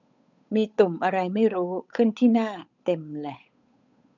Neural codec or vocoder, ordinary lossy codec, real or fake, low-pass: codec, 16 kHz, 8 kbps, FunCodec, trained on Chinese and English, 25 frames a second; none; fake; 7.2 kHz